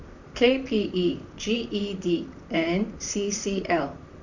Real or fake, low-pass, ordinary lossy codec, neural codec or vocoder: fake; 7.2 kHz; none; vocoder, 44.1 kHz, 128 mel bands, Pupu-Vocoder